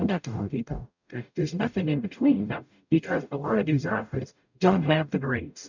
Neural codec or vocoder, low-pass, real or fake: codec, 44.1 kHz, 0.9 kbps, DAC; 7.2 kHz; fake